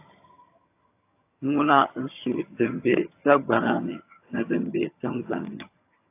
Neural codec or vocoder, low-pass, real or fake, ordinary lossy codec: vocoder, 22.05 kHz, 80 mel bands, HiFi-GAN; 3.6 kHz; fake; AAC, 24 kbps